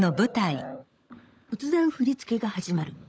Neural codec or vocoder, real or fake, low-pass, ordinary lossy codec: codec, 16 kHz, 16 kbps, FunCodec, trained on LibriTTS, 50 frames a second; fake; none; none